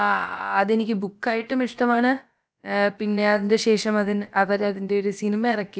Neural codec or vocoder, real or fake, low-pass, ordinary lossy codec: codec, 16 kHz, about 1 kbps, DyCAST, with the encoder's durations; fake; none; none